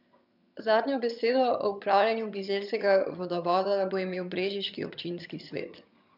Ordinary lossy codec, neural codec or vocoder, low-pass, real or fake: none; vocoder, 22.05 kHz, 80 mel bands, HiFi-GAN; 5.4 kHz; fake